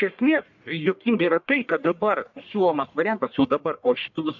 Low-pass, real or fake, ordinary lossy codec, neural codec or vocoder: 7.2 kHz; fake; MP3, 64 kbps; codec, 44.1 kHz, 1.7 kbps, Pupu-Codec